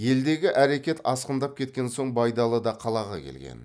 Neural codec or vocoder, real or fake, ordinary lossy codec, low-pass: none; real; none; none